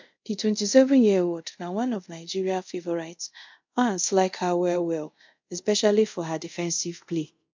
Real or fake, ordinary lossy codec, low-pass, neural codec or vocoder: fake; MP3, 64 kbps; 7.2 kHz; codec, 24 kHz, 0.5 kbps, DualCodec